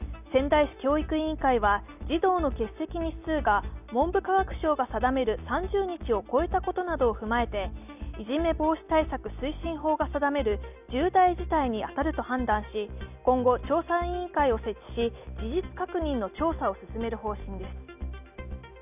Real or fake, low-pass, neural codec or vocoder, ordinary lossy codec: real; 3.6 kHz; none; none